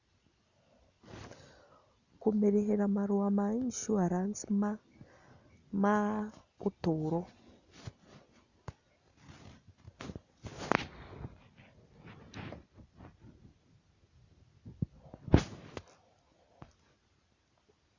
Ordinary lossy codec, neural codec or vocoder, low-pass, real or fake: Opus, 64 kbps; none; 7.2 kHz; real